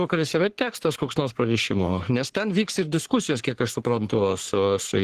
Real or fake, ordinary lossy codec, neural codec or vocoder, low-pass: fake; Opus, 16 kbps; codec, 44.1 kHz, 3.4 kbps, Pupu-Codec; 14.4 kHz